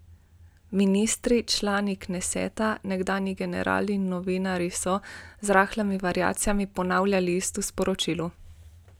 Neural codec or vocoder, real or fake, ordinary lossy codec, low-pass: none; real; none; none